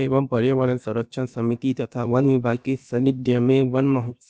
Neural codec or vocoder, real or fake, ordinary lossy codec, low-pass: codec, 16 kHz, about 1 kbps, DyCAST, with the encoder's durations; fake; none; none